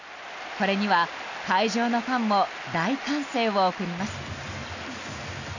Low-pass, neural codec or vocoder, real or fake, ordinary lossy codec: 7.2 kHz; none; real; none